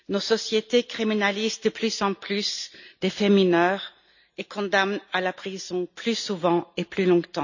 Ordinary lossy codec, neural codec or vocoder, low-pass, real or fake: none; none; 7.2 kHz; real